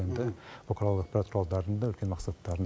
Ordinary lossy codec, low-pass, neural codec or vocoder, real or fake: none; none; none; real